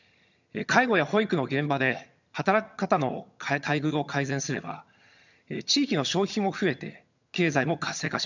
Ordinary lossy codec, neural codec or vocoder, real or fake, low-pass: none; vocoder, 22.05 kHz, 80 mel bands, HiFi-GAN; fake; 7.2 kHz